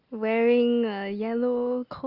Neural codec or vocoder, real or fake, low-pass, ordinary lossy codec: none; real; 5.4 kHz; Opus, 24 kbps